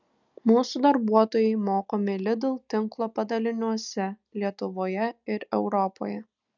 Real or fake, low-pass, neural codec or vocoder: real; 7.2 kHz; none